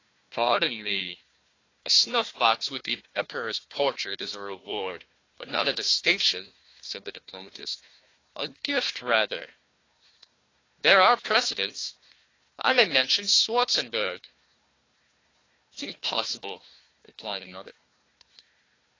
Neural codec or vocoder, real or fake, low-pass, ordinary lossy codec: codec, 16 kHz, 1 kbps, FunCodec, trained on Chinese and English, 50 frames a second; fake; 7.2 kHz; AAC, 32 kbps